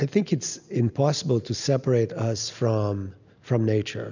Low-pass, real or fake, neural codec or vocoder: 7.2 kHz; real; none